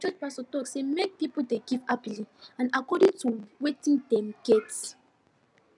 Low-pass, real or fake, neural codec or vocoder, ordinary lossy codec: 10.8 kHz; real; none; none